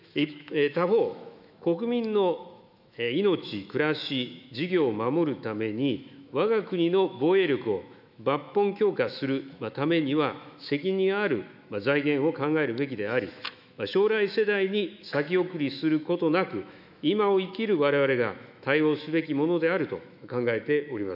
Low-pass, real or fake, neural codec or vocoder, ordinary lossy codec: 5.4 kHz; fake; autoencoder, 48 kHz, 128 numbers a frame, DAC-VAE, trained on Japanese speech; none